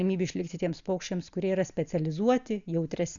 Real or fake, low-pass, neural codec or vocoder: real; 7.2 kHz; none